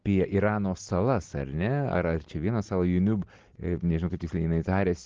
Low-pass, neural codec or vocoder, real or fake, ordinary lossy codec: 7.2 kHz; none; real; Opus, 16 kbps